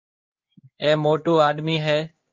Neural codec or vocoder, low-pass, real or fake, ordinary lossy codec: codec, 16 kHz in and 24 kHz out, 1 kbps, XY-Tokenizer; 7.2 kHz; fake; Opus, 24 kbps